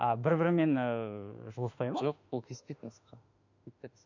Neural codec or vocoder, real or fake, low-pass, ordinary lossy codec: autoencoder, 48 kHz, 32 numbers a frame, DAC-VAE, trained on Japanese speech; fake; 7.2 kHz; none